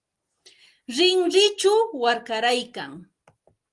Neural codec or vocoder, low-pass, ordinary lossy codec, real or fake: none; 10.8 kHz; Opus, 24 kbps; real